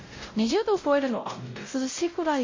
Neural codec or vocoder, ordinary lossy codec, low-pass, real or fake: codec, 16 kHz, 0.5 kbps, X-Codec, WavLM features, trained on Multilingual LibriSpeech; MP3, 32 kbps; 7.2 kHz; fake